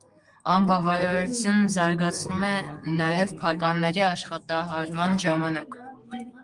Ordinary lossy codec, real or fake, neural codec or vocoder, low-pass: Opus, 32 kbps; fake; codec, 32 kHz, 1.9 kbps, SNAC; 10.8 kHz